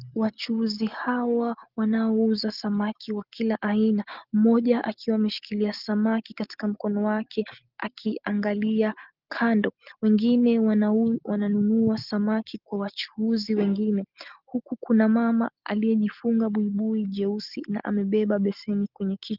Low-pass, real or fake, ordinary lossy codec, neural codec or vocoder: 5.4 kHz; real; Opus, 32 kbps; none